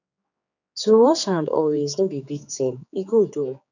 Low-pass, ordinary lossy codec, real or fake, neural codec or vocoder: 7.2 kHz; none; fake; codec, 16 kHz, 4 kbps, X-Codec, HuBERT features, trained on general audio